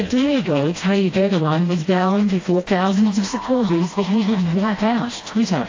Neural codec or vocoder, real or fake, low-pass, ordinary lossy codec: codec, 16 kHz, 1 kbps, FreqCodec, smaller model; fake; 7.2 kHz; AAC, 32 kbps